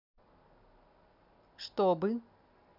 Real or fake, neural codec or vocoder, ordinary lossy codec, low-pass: real; none; none; 5.4 kHz